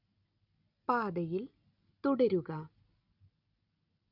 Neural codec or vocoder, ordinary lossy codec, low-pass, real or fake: none; none; 5.4 kHz; real